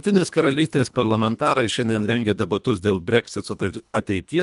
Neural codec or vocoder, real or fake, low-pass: codec, 24 kHz, 1.5 kbps, HILCodec; fake; 10.8 kHz